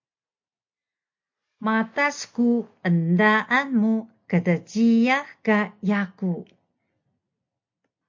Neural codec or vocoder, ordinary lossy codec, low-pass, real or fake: none; MP3, 48 kbps; 7.2 kHz; real